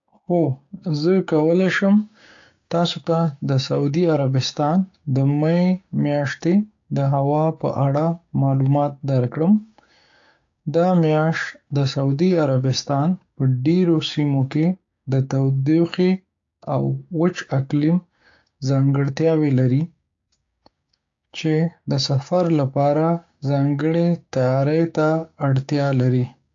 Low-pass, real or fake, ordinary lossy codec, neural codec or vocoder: 7.2 kHz; fake; AAC, 48 kbps; codec, 16 kHz, 6 kbps, DAC